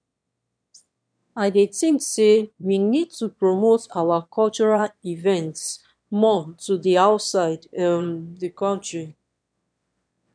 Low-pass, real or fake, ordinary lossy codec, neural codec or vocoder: 9.9 kHz; fake; none; autoencoder, 22.05 kHz, a latent of 192 numbers a frame, VITS, trained on one speaker